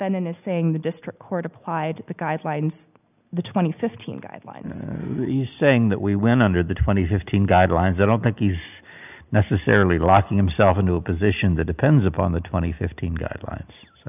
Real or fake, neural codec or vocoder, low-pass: real; none; 3.6 kHz